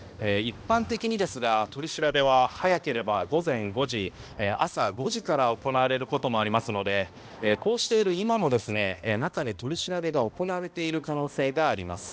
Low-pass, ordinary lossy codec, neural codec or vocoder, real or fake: none; none; codec, 16 kHz, 1 kbps, X-Codec, HuBERT features, trained on balanced general audio; fake